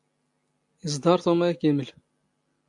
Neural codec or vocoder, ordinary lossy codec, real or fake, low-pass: none; AAC, 64 kbps; real; 10.8 kHz